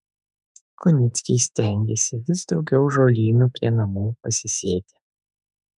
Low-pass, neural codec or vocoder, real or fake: 10.8 kHz; autoencoder, 48 kHz, 32 numbers a frame, DAC-VAE, trained on Japanese speech; fake